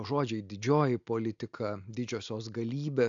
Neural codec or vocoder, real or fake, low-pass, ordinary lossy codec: none; real; 7.2 kHz; AAC, 64 kbps